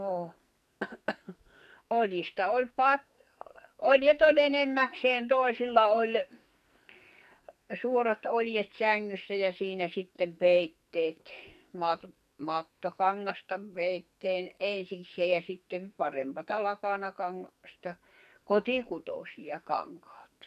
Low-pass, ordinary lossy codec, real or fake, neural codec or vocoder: 14.4 kHz; none; fake; codec, 44.1 kHz, 2.6 kbps, SNAC